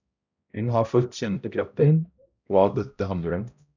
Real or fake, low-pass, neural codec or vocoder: fake; 7.2 kHz; codec, 16 kHz, 0.5 kbps, X-Codec, HuBERT features, trained on balanced general audio